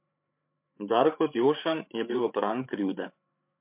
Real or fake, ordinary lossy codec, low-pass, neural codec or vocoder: fake; MP3, 32 kbps; 3.6 kHz; codec, 16 kHz, 8 kbps, FreqCodec, larger model